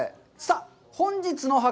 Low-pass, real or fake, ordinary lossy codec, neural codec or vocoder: none; real; none; none